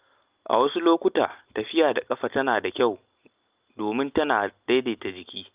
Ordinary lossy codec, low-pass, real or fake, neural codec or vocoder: Opus, 24 kbps; 3.6 kHz; real; none